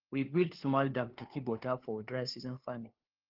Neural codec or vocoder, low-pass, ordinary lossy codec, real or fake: codec, 16 kHz, 2 kbps, FunCodec, trained on LibriTTS, 25 frames a second; 5.4 kHz; Opus, 16 kbps; fake